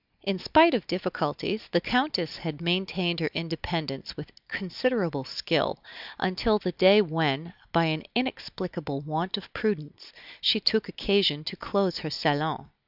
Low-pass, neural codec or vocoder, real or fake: 5.4 kHz; none; real